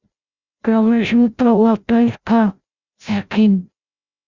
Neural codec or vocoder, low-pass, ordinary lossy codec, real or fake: codec, 16 kHz, 0.5 kbps, FreqCodec, larger model; 7.2 kHz; Opus, 64 kbps; fake